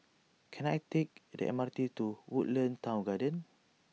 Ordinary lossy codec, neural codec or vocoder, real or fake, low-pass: none; none; real; none